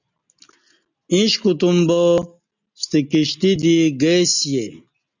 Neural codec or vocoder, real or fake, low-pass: none; real; 7.2 kHz